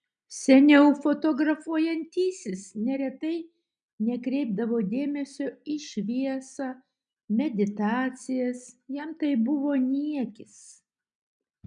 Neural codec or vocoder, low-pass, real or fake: none; 9.9 kHz; real